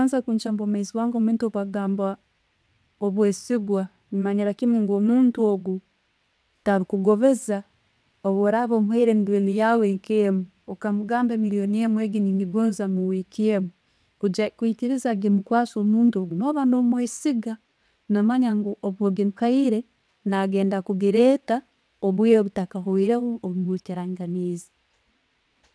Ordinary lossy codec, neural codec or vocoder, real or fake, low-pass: none; vocoder, 22.05 kHz, 80 mel bands, WaveNeXt; fake; none